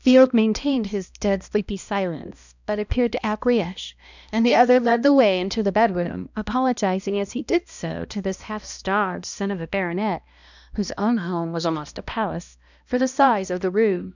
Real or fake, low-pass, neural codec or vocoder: fake; 7.2 kHz; codec, 16 kHz, 1 kbps, X-Codec, HuBERT features, trained on balanced general audio